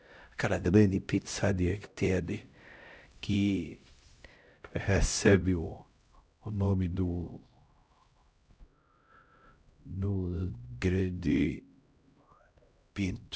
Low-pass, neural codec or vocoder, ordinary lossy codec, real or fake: none; codec, 16 kHz, 0.5 kbps, X-Codec, HuBERT features, trained on LibriSpeech; none; fake